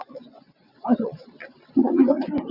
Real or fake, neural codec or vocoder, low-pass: fake; vocoder, 22.05 kHz, 80 mel bands, WaveNeXt; 5.4 kHz